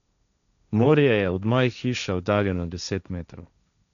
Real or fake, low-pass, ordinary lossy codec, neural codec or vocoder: fake; 7.2 kHz; none; codec, 16 kHz, 1.1 kbps, Voila-Tokenizer